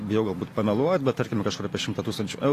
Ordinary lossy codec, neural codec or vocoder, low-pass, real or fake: AAC, 48 kbps; none; 14.4 kHz; real